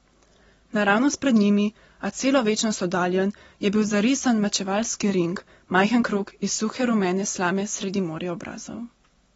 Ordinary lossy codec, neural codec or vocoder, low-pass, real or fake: AAC, 24 kbps; none; 10.8 kHz; real